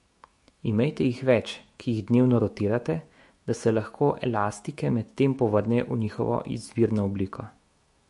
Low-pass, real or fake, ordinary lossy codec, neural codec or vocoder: 14.4 kHz; fake; MP3, 48 kbps; autoencoder, 48 kHz, 128 numbers a frame, DAC-VAE, trained on Japanese speech